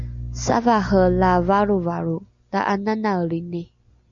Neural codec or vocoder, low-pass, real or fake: none; 7.2 kHz; real